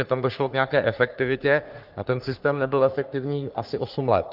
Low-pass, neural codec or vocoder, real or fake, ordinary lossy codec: 5.4 kHz; codec, 44.1 kHz, 3.4 kbps, Pupu-Codec; fake; Opus, 32 kbps